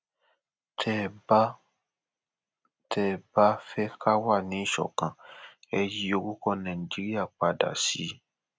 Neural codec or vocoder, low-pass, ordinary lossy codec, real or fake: none; none; none; real